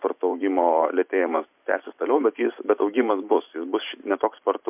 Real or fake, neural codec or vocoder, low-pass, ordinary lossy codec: fake; vocoder, 44.1 kHz, 128 mel bands every 512 samples, BigVGAN v2; 3.6 kHz; MP3, 32 kbps